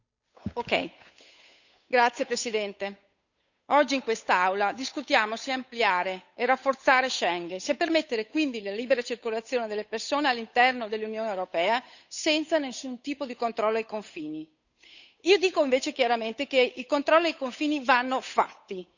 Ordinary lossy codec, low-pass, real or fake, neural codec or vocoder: none; 7.2 kHz; fake; codec, 16 kHz, 8 kbps, FunCodec, trained on Chinese and English, 25 frames a second